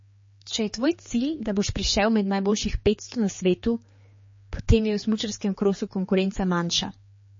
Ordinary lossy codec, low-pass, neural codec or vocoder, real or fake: MP3, 32 kbps; 7.2 kHz; codec, 16 kHz, 4 kbps, X-Codec, HuBERT features, trained on general audio; fake